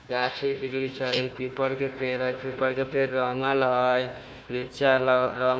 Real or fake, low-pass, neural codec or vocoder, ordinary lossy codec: fake; none; codec, 16 kHz, 1 kbps, FunCodec, trained on Chinese and English, 50 frames a second; none